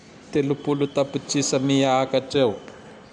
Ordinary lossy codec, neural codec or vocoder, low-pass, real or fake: none; none; 10.8 kHz; real